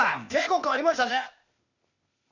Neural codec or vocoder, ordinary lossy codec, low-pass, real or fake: codec, 16 kHz, 0.8 kbps, ZipCodec; none; 7.2 kHz; fake